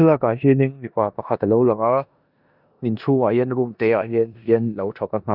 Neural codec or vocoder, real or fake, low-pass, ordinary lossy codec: codec, 16 kHz in and 24 kHz out, 0.9 kbps, LongCat-Audio-Codec, four codebook decoder; fake; 5.4 kHz; none